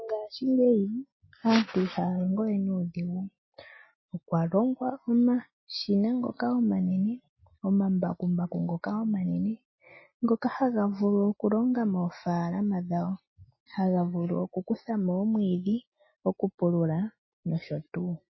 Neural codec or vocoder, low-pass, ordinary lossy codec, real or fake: none; 7.2 kHz; MP3, 24 kbps; real